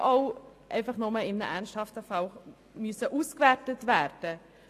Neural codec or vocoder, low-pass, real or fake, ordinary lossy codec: none; 14.4 kHz; real; AAC, 64 kbps